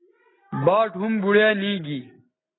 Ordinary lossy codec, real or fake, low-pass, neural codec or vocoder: AAC, 16 kbps; real; 7.2 kHz; none